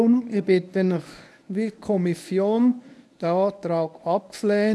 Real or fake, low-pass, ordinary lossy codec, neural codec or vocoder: fake; none; none; codec, 24 kHz, 0.9 kbps, WavTokenizer, medium speech release version 1